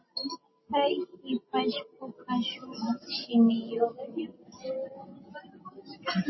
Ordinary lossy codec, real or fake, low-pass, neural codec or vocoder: MP3, 24 kbps; real; 7.2 kHz; none